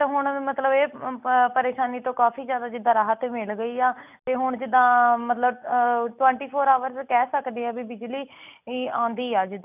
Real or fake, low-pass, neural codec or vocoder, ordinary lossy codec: real; 3.6 kHz; none; none